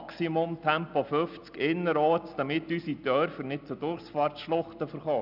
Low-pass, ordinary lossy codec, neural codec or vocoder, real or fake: 5.4 kHz; none; none; real